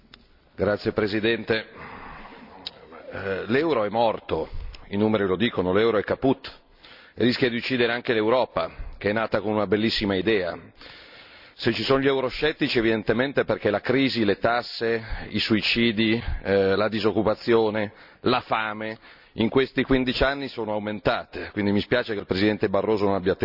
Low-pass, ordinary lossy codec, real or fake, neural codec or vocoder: 5.4 kHz; none; real; none